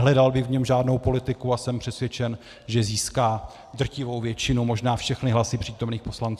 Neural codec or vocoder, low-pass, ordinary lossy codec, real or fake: none; 14.4 kHz; AAC, 96 kbps; real